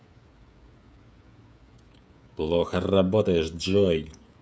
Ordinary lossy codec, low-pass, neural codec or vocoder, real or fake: none; none; codec, 16 kHz, 16 kbps, FreqCodec, smaller model; fake